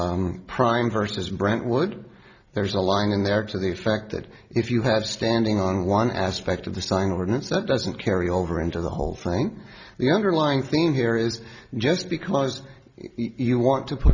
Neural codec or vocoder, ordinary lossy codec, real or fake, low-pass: none; Opus, 64 kbps; real; 7.2 kHz